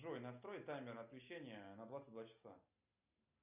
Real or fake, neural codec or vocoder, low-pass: real; none; 3.6 kHz